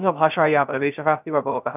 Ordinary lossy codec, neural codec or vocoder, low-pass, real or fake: none; codec, 16 kHz, 0.3 kbps, FocalCodec; 3.6 kHz; fake